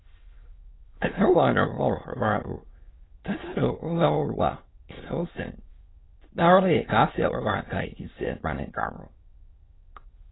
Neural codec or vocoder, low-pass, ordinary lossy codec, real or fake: autoencoder, 22.05 kHz, a latent of 192 numbers a frame, VITS, trained on many speakers; 7.2 kHz; AAC, 16 kbps; fake